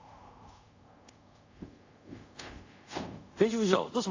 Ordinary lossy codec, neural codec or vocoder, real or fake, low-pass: AAC, 48 kbps; codec, 24 kHz, 0.5 kbps, DualCodec; fake; 7.2 kHz